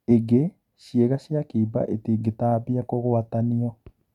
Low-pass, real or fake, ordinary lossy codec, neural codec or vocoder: 19.8 kHz; real; MP3, 96 kbps; none